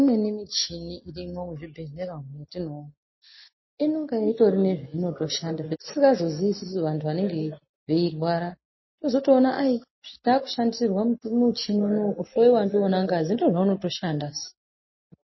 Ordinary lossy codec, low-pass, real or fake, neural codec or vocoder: MP3, 24 kbps; 7.2 kHz; real; none